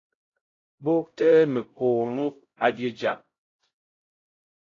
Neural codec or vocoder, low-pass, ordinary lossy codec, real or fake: codec, 16 kHz, 0.5 kbps, X-Codec, HuBERT features, trained on LibriSpeech; 7.2 kHz; AAC, 32 kbps; fake